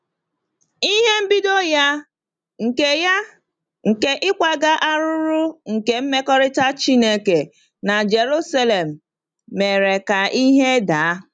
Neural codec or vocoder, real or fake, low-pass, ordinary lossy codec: none; real; 9.9 kHz; none